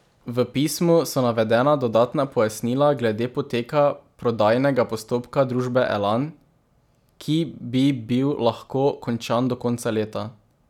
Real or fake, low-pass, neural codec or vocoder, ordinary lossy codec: real; 19.8 kHz; none; none